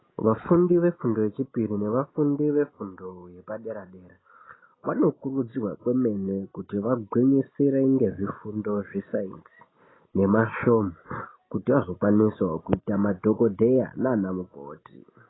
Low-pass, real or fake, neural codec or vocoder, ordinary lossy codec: 7.2 kHz; real; none; AAC, 16 kbps